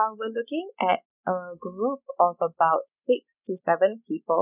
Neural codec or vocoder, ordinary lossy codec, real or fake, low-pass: none; none; real; 3.6 kHz